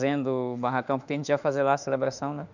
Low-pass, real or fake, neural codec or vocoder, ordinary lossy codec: 7.2 kHz; fake; autoencoder, 48 kHz, 32 numbers a frame, DAC-VAE, trained on Japanese speech; none